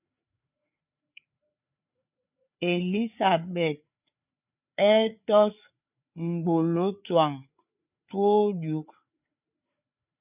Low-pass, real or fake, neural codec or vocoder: 3.6 kHz; fake; codec, 16 kHz, 4 kbps, FreqCodec, larger model